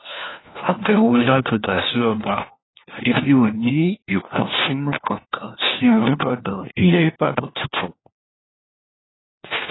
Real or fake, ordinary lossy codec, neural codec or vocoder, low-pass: fake; AAC, 16 kbps; codec, 16 kHz, 1 kbps, FunCodec, trained on LibriTTS, 50 frames a second; 7.2 kHz